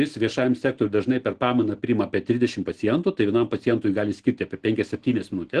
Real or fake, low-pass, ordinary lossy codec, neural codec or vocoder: real; 9.9 kHz; Opus, 16 kbps; none